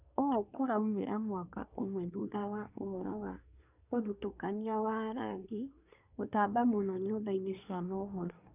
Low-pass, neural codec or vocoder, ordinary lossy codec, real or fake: 3.6 kHz; codec, 24 kHz, 1 kbps, SNAC; none; fake